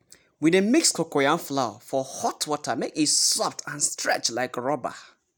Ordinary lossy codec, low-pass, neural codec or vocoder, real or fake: none; none; none; real